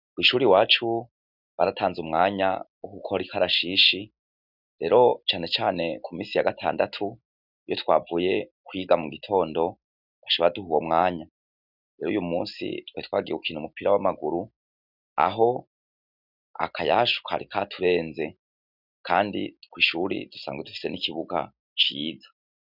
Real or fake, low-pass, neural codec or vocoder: real; 5.4 kHz; none